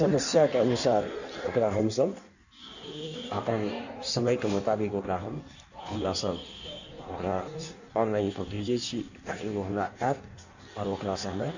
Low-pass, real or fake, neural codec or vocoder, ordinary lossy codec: 7.2 kHz; fake; codec, 16 kHz in and 24 kHz out, 1.1 kbps, FireRedTTS-2 codec; none